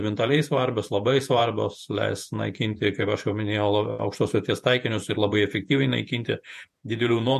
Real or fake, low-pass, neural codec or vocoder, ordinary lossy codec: fake; 14.4 kHz; vocoder, 48 kHz, 128 mel bands, Vocos; MP3, 64 kbps